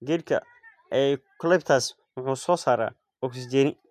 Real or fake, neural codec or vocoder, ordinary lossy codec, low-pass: real; none; MP3, 96 kbps; 14.4 kHz